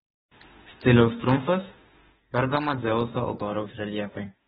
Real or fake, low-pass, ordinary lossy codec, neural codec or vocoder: fake; 19.8 kHz; AAC, 16 kbps; autoencoder, 48 kHz, 32 numbers a frame, DAC-VAE, trained on Japanese speech